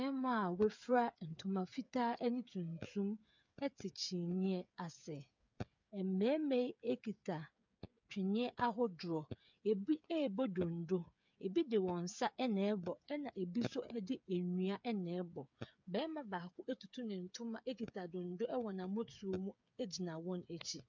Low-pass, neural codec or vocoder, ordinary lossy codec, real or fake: 7.2 kHz; codec, 16 kHz, 8 kbps, FreqCodec, smaller model; MP3, 64 kbps; fake